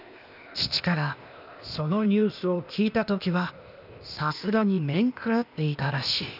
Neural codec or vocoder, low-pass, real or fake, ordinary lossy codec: codec, 16 kHz, 0.8 kbps, ZipCodec; 5.4 kHz; fake; none